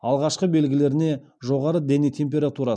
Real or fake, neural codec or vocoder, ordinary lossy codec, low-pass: real; none; none; 9.9 kHz